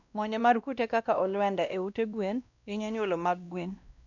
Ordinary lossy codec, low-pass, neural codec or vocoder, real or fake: none; 7.2 kHz; codec, 16 kHz, 1 kbps, X-Codec, WavLM features, trained on Multilingual LibriSpeech; fake